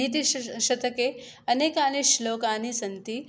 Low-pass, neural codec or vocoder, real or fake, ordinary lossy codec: none; none; real; none